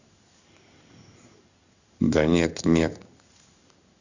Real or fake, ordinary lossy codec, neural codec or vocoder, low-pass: fake; none; codec, 16 kHz in and 24 kHz out, 1 kbps, XY-Tokenizer; 7.2 kHz